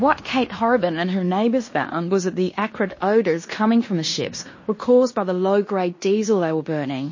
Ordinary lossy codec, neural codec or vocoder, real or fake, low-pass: MP3, 32 kbps; codec, 16 kHz in and 24 kHz out, 0.9 kbps, LongCat-Audio-Codec, fine tuned four codebook decoder; fake; 7.2 kHz